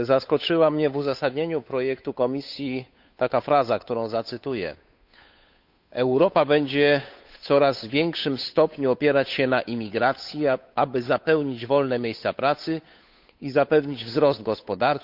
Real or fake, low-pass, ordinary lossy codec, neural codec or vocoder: fake; 5.4 kHz; none; codec, 16 kHz, 8 kbps, FunCodec, trained on Chinese and English, 25 frames a second